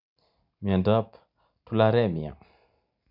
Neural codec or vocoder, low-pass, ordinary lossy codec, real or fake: none; 5.4 kHz; none; real